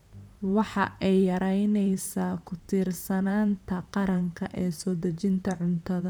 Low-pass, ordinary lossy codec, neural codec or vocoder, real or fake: none; none; vocoder, 44.1 kHz, 128 mel bands, Pupu-Vocoder; fake